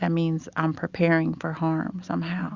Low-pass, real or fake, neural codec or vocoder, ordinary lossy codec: 7.2 kHz; real; none; Opus, 64 kbps